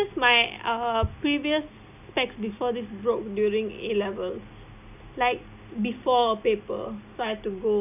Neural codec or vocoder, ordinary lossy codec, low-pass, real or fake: none; none; 3.6 kHz; real